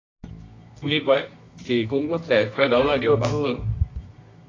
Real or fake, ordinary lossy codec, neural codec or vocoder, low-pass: fake; AAC, 32 kbps; codec, 24 kHz, 0.9 kbps, WavTokenizer, medium music audio release; 7.2 kHz